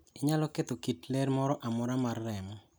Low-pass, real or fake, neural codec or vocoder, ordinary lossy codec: none; real; none; none